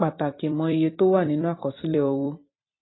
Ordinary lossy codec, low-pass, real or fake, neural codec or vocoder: AAC, 16 kbps; 7.2 kHz; fake; vocoder, 44.1 kHz, 128 mel bands every 256 samples, BigVGAN v2